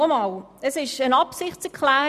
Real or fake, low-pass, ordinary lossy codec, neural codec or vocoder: real; 14.4 kHz; none; none